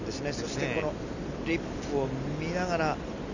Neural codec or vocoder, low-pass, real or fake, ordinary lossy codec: none; 7.2 kHz; real; none